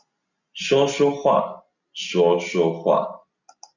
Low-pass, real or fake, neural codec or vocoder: 7.2 kHz; real; none